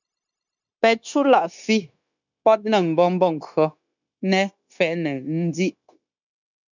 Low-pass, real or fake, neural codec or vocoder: 7.2 kHz; fake; codec, 16 kHz, 0.9 kbps, LongCat-Audio-Codec